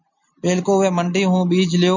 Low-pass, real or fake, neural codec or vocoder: 7.2 kHz; real; none